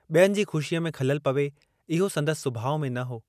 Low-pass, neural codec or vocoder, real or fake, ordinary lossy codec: 14.4 kHz; none; real; none